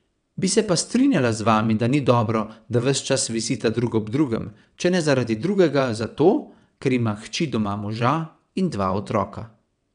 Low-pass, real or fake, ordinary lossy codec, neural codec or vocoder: 9.9 kHz; fake; none; vocoder, 22.05 kHz, 80 mel bands, WaveNeXt